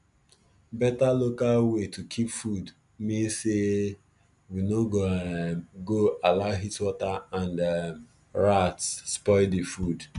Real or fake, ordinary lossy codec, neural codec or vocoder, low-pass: real; none; none; 10.8 kHz